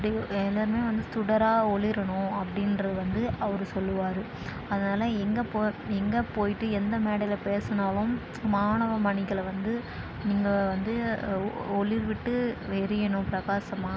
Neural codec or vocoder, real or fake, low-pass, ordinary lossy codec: none; real; none; none